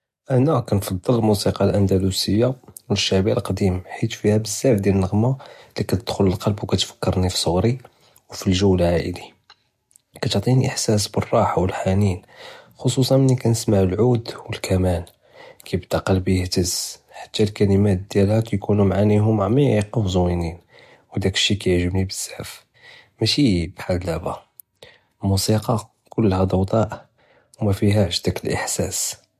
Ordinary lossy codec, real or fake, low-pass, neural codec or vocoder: MP3, 64 kbps; real; 14.4 kHz; none